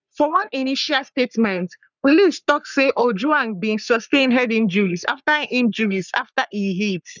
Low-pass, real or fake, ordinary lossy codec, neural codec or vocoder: 7.2 kHz; fake; none; codec, 44.1 kHz, 3.4 kbps, Pupu-Codec